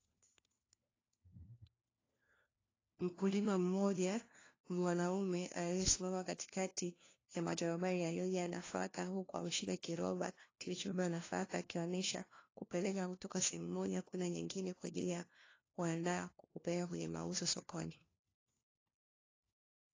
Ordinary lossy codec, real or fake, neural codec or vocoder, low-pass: AAC, 32 kbps; fake; codec, 16 kHz, 1 kbps, FunCodec, trained on LibriTTS, 50 frames a second; 7.2 kHz